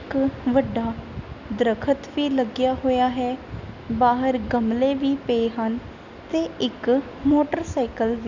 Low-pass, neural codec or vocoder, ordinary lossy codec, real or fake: 7.2 kHz; none; none; real